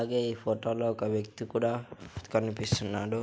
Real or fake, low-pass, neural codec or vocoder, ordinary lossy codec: real; none; none; none